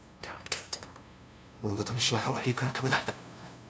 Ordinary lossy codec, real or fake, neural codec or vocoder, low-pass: none; fake; codec, 16 kHz, 0.5 kbps, FunCodec, trained on LibriTTS, 25 frames a second; none